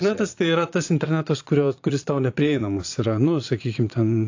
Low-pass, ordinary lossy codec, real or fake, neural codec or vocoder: 7.2 kHz; AAC, 48 kbps; fake; vocoder, 22.05 kHz, 80 mel bands, Vocos